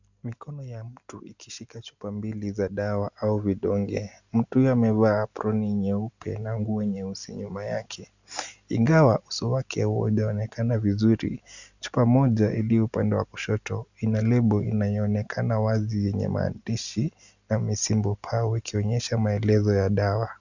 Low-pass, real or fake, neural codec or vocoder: 7.2 kHz; real; none